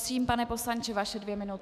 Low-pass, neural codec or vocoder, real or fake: 14.4 kHz; autoencoder, 48 kHz, 128 numbers a frame, DAC-VAE, trained on Japanese speech; fake